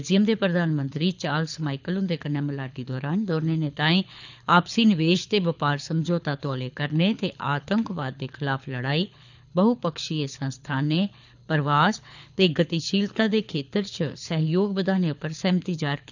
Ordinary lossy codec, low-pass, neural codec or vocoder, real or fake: none; 7.2 kHz; codec, 24 kHz, 6 kbps, HILCodec; fake